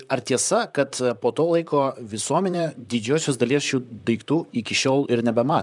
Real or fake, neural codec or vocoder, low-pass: fake; vocoder, 44.1 kHz, 128 mel bands, Pupu-Vocoder; 10.8 kHz